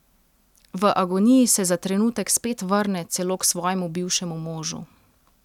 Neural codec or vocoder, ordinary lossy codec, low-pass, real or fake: none; none; 19.8 kHz; real